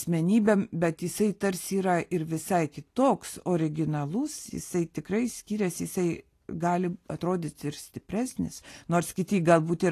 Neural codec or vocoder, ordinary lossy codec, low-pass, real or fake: none; AAC, 48 kbps; 14.4 kHz; real